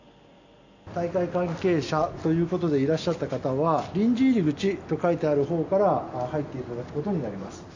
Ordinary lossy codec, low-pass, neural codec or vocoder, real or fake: AAC, 48 kbps; 7.2 kHz; none; real